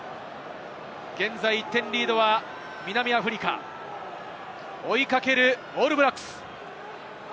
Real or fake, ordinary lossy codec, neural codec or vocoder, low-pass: real; none; none; none